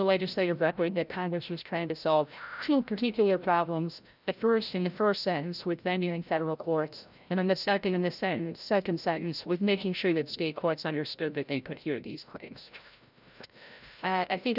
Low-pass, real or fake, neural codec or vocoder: 5.4 kHz; fake; codec, 16 kHz, 0.5 kbps, FreqCodec, larger model